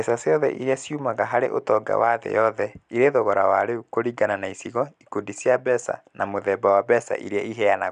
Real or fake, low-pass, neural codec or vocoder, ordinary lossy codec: real; 9.9 kHz; none; none